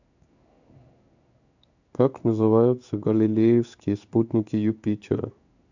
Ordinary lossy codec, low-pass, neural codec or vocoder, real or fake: none; 7.2 kHz; codec, 16 kHz in and 24 kHz out, 1 kbps, XY-Tokenizer; fake